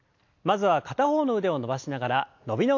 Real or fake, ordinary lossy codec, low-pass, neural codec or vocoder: real; AAC, 48 kbps; 7.2 kHz; none